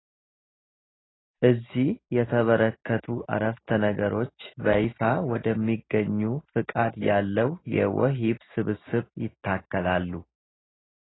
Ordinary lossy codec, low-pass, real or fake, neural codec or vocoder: AAC, 16 kbps; 7.2 kHz; real; none